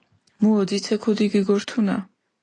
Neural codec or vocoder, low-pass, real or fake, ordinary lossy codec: none; 9.9 kHz; real; AAC, 32 kbps